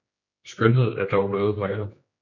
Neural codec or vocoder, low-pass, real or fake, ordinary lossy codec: codec, 16 kHz, 2 kbps, X-Codec, HuBERT features, trained on general audio; 7.2 kHz; fake; MP3, 48 kbps